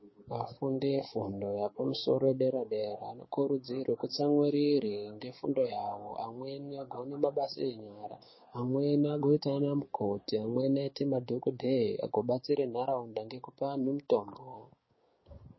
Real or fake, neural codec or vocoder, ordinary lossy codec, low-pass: fake; codec, 16 kHz, 6 kbps, DAC; MP3, 24 kbps; 7.2 kHz